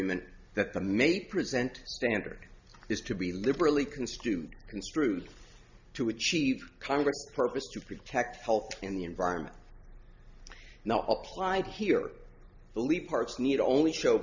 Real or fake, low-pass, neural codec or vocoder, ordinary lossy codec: real; 7.2 kHz; none; Opus, 64 kbps